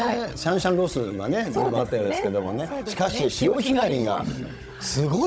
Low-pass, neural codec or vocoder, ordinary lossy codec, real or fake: none; codec, 16 kHz, 16 kbps, FunCodec, trained on Chinese and English, 50 frames a second; none; fake